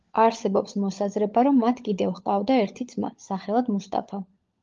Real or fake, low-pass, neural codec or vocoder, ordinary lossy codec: fake; 7.2 kHz; codec, 16 kHz, 16 kbps, FunCodec, trained on LibriTTS, 50 frames a second; Opus, 32 kbps